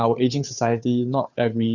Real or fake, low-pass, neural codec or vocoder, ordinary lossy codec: fake; 7.2 kHz; codec, 16 kHz, 2 kbps, FunCodec, trained on Chinese and English, 25 frames a second; none